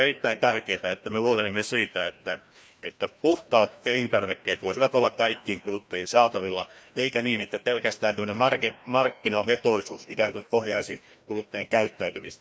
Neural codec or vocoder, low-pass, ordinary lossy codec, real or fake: codec, 16 kHz, 1 kbps, FreqCodec, larger model; none; none; fake